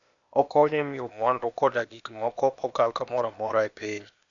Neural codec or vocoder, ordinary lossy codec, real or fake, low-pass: codec, 16 kHz, 0.8 kbps, ZipCodec; none; fake; 7.2 kHz